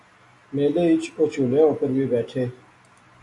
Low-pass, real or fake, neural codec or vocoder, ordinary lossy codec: 10.8 kHz; real; none; AAC, 48 kbps